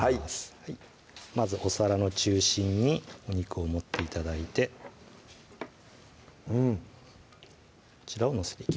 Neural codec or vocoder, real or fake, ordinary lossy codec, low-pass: none; real; none; none